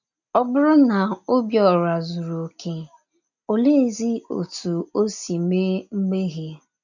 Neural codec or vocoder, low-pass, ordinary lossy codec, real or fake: none; 7.2 kHz; none; real